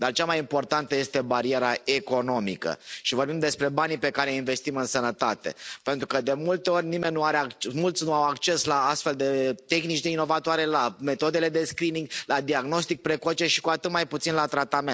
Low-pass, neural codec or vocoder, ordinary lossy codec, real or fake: none; none; none; real